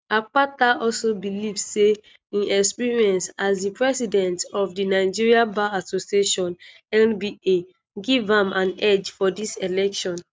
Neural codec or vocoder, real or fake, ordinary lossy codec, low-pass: none; real; none; none